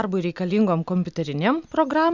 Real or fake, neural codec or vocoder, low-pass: real; none; 7.2 kHz